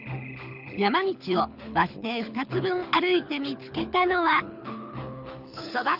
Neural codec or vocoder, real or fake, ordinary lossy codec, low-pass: codec, 24 kHz, 6 kbps, HILCodec; fake; none; 5.4 kHz